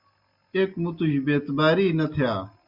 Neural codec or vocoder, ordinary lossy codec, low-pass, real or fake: none; Opus, 64 kbps; 5.4 kHz; real